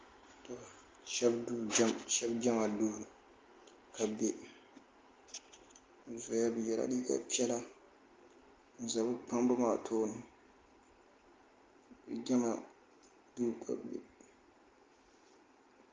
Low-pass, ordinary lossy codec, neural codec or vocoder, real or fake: 7.2 kHz; Opus, 32 kbps; none; real